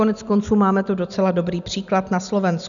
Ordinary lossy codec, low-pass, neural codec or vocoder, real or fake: MP3, 96 kbps; 7.2 kHz; none; real